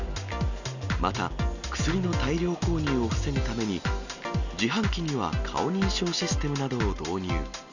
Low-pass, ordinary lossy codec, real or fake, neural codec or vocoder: 7.2 kHz; none; real; none